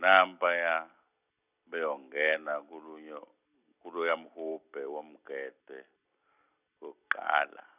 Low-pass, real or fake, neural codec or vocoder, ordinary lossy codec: 3.6 kHz; real; none; none